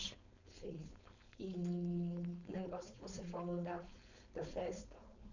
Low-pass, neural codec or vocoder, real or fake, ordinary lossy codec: 7.2 kHz; codec, 16 kHz, 4.8 kbps, FACodec; fake; none